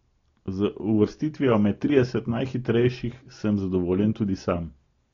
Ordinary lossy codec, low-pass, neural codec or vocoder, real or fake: AAC, 32 kbps; 7.2 kHz; none; real